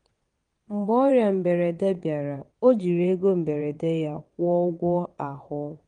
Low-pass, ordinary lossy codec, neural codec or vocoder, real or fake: 9.9 kHz; Opus, 24 kbps; vocoder, 22.05 kHz, 80 mel bands, WaveNeXt; fake